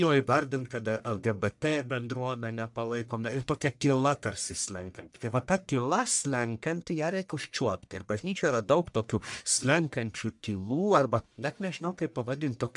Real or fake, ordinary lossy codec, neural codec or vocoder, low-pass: fake; MP3, 96 kbps; codec, 44.1 kHz, 1.7 kbps, Pupu-Codec; 10.8 kHz